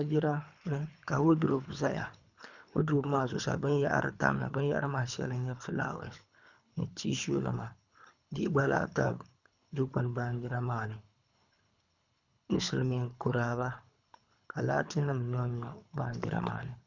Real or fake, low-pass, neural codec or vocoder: fake; 7.2 kHz; codec, 24 kHz, 3 kbps, HILCodec